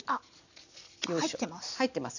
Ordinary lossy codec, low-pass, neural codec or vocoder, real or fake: none; 7.2 kHz; none; real